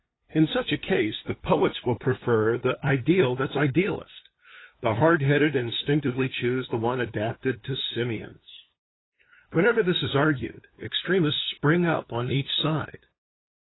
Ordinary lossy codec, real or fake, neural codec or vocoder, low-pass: AAC, 16 kbps; fake; codec, 16 kHz, 2 kbps, FunCodec, trained on LibriTTS, 25 frames a second; 7.2 kHz